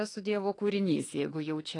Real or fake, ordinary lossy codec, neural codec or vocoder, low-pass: fake; AAC, 32 kbps; autoencoder, 48 kHz, 32 numbers a frame, DAC-VAE, trained on Japanese speech; 10.8 kHz